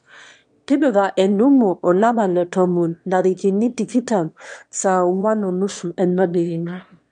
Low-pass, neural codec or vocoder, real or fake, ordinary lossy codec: 9.9 kHz; autoencoder, 22.05 kHz, a latent of 192 numbers a frame, VITS, trained on one speaker; fake; MP3, 64 kbps